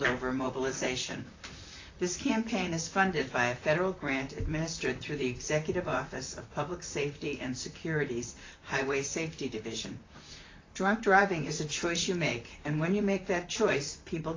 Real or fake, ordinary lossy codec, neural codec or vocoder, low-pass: fake; AAC, 32 kbps; vocoder, 44.1 kHz, 128 mel bands, Pupu-Vocoder; 7.2 kHz